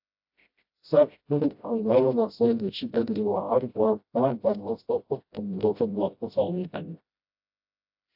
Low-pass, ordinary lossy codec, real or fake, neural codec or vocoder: 5.4 kHz; AAC, 48 kbps; fake; codec, 16 kHz, 0.5 kbps, FreqCodec, smaller model